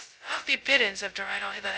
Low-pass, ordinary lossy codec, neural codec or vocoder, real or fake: none; none; codec, 16 kHz, 0.2 kbps, FocalCodec; fake